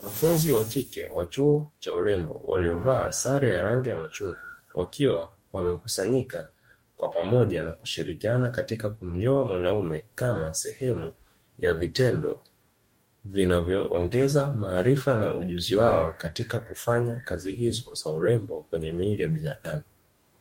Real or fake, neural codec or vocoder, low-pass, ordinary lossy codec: fake; codec, 44.1 kHz, 2.6 kbps, DAC; 19.8 kHz; MP3, 64 kbps